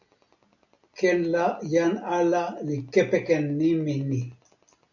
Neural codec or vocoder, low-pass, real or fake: none; 7.2 kHz; real